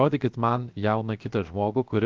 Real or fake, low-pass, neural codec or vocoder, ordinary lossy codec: fake; 7.2 kHz; codec, 16 kHz, 0.7 kbps, FocalCodec; Opus, 32 kbps